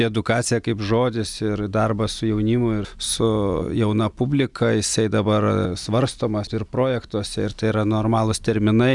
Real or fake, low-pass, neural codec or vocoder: real; 10.8 kHz; none